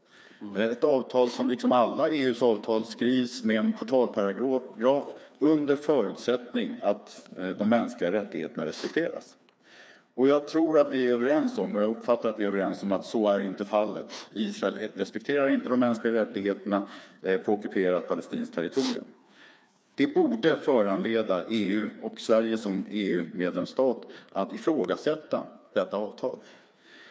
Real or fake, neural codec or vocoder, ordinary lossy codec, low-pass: fake; codec, 16 kHz, 2 kbps, FreqCodec, larger model; none; none